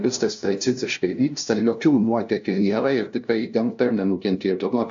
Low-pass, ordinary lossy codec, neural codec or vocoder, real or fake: 7.2 kHz; AAC, 64 kbps; codec, 16 kHz, 0.5 kbps, FunCodec, trained on LibriTTS, 25 frames a second; fake